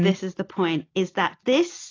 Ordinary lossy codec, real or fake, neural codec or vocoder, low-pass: AAC, 32 kbps; real; none; 7.2 kHz